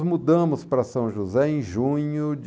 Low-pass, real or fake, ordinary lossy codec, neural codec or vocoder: none; real; none; none